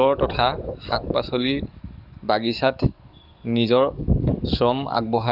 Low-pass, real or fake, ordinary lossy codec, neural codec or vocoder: 5.4 kHz; fake; none; codec, 44.1 kHz, 7.8 kbps, DAC